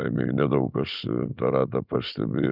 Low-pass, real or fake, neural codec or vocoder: 5.4 kHz; real; none